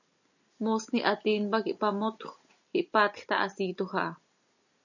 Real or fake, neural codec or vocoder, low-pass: real; none; 7.2 kHz